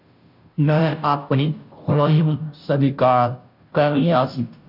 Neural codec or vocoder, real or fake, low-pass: codec, 16 kHz, 0.5 kbps, FunCodec, trained on Chinese and English, 25 frames a second; fake; 5.4 kHz